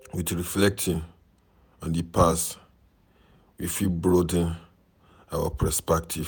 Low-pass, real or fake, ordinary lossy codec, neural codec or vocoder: none; fake; none; vocoder, 48 kHz, 128 mel bands, Vocos